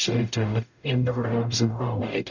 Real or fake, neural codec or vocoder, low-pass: fake; codec, 44.1 kHz, 0.9 kbps, DAC; 7.2 kHz